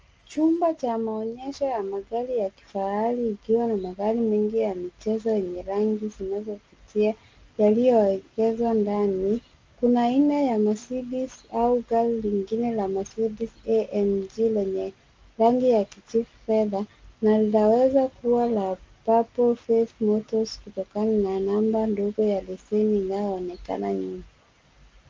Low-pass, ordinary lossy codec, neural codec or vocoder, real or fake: 7.2 kHz; Opus, 24 kbps; none; real